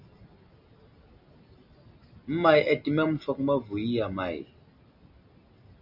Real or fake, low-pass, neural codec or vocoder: real; 5.4 kHz; none